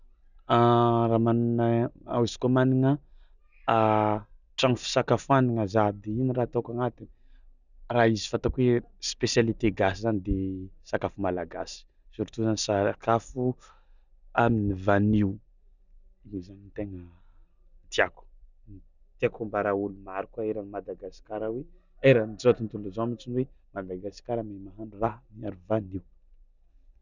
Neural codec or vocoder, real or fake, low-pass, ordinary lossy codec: none; real; 7.2 kHz; none